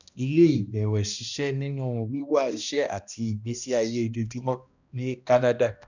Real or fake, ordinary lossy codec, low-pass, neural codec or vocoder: fake; none; 7.2 kHz; codec, 16 kHz, 1 kbps, X-Codec, HuBERT features, trained on balanced general audio